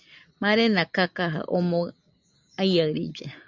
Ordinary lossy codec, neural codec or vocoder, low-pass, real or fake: MP3, 64 kbps; none; 7.2 kHz; real